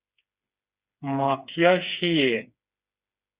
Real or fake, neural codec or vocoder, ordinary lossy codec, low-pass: fake; codec, 16 kHz, 4 kbps, FreqCodec, smaller model; Opus, 64 kbps; 3.6 kHz